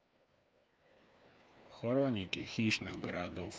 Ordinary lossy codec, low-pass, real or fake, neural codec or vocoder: none; none; fake; codec, 16 kHz, 2 kbps, FreqCodec, larger model